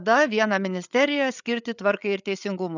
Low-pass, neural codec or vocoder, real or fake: 7.2 kHz; codec, 16 kHz, 8 kbps, FreqCodec, larger model; fake